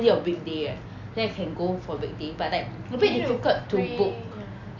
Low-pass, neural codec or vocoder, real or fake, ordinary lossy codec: 7.2 kHz; none; real; AAC, 48 kbps